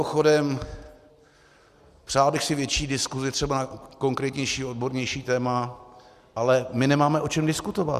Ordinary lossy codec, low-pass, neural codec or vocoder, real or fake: Opus, 64 kbps; 14.4 kHz; none; real